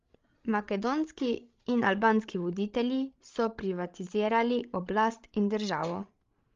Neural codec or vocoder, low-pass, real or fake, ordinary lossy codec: codec, 16 kHz, 16 kbps, FreqCodec, larger model; 7.2 kHz; fake; Opus, 32 kbps